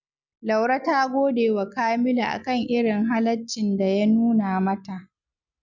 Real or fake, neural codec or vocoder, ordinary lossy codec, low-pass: real; none; none; 7.2 kHz